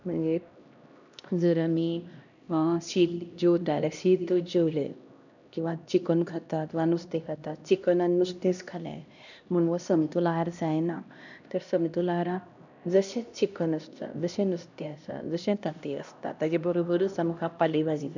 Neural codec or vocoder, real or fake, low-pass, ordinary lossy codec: codec, 16 kHz, 1 kbps, X-Codec, HuBERT features, trained on LibriSpeech; fake; 7.2 kHz; none